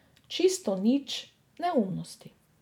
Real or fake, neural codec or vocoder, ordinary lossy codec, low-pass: fake; vocoder, 44.1 kHz, 128 mel bands every 256 samples, BigVGAN v2; none; 19.8 kHz